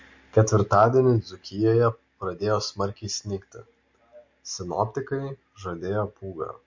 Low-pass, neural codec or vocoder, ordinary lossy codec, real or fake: 7.2 kHz; none; MP3, 48 kbps; real